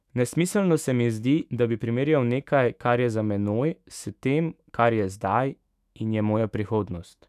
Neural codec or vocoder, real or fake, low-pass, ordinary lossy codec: autoencoder, 48 kHz, 128 numbers a frame, DAC-VAE, trained on Japanese speech; fake; 14.4 kHz; none